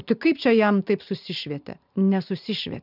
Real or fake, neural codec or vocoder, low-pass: fake; vocoder, 44.1 kHz, 128 mel bands every 512 samples, BigVGAN v2; 5.4 kHz